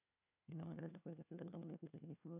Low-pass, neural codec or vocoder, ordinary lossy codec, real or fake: 3.6 kHz; codec, 16 kHz, 1 kbps, FunCodec, trained on LibriTTS, 50 frames a second; AAC, 32 kbps; fake